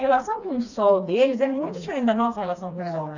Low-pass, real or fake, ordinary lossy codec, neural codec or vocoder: 7.2 kHz; fake; none; codec, 16 kHz, 2 kbps, FreqCodec, smaller model